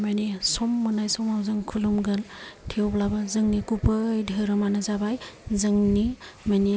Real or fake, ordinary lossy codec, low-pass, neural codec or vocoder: real; none; none; none